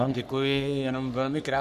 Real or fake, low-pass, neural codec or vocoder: fake; 14.4 kHz; codec, 44.1 kHz, 3.4 kbps, Pupu-Codec